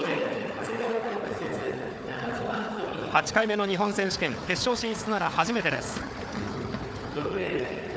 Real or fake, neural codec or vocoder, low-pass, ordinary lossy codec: fake; codec, 16 kHz, 4 kbps, FunCodec, trained on Chinese and English, 50 frames a second; none; none